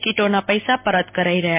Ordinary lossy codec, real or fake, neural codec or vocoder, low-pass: MP3, 32 kbps; real; none; 3.6 kHz